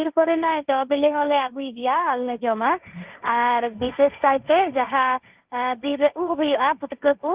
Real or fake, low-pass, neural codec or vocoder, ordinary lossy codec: fake; 3.6 kHz; codec, 16 kHz, 1.1 kbps, Voila-Tokenizer; Opus, 32 kbps